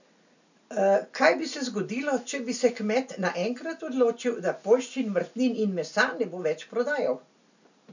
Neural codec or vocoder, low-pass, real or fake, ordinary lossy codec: none; 7.2 kHz; real; none